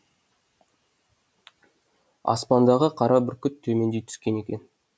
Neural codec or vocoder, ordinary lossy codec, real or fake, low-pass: none; none; real; none